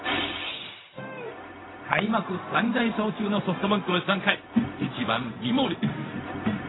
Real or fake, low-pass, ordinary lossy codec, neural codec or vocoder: fake; 7.2 kHz; AAC, 16 kbps; codec, 16 kHz, 0.4 kbps, LongCat-Audio-Codec